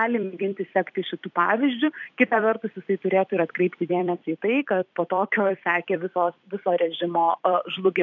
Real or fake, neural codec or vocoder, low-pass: fake; vocoder, 44.1 kHz, 128 mel bands every 256 samples, BigVGAN v2; 7.2 kHz